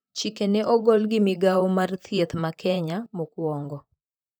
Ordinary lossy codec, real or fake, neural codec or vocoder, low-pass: none; fake; vocoder, 44.1 kHz, 128 mel bands, Pupu-Vocoder; none